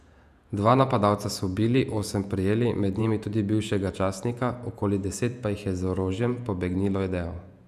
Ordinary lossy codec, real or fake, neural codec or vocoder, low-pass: none; fake; vocoder, 48 kHz, 128 mel bands, Vocos; 14.4 kHz